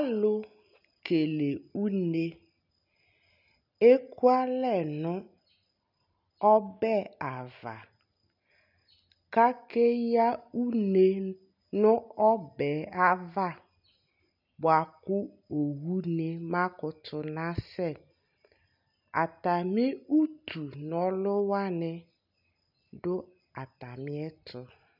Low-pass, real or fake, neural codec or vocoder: 5.4 kHz; real; none